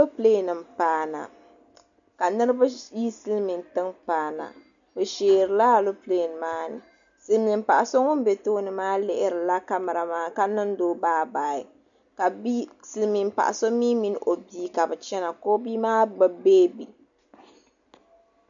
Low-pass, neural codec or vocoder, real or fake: 7.2 kHz; none; real